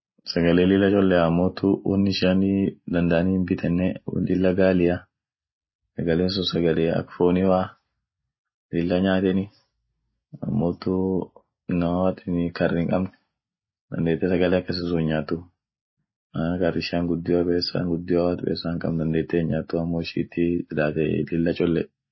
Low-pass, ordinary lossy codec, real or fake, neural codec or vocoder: 7.2 kHz; MP3, 24 kbps; real; none